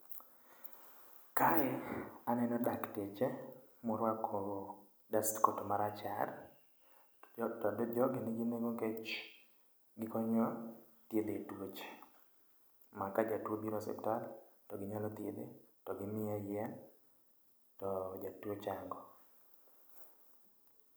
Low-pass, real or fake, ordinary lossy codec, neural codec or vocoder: none; real; none; none